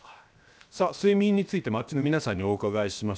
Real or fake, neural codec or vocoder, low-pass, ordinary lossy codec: fake; codec, 16 kHz, 0.7 kbps, FocalCodec; none; none